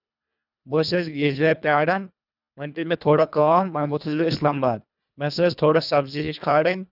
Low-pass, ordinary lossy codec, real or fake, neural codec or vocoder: 5.4 kHz; none; fake; codec, 24 kHz, 1.5 kbps, HILCodec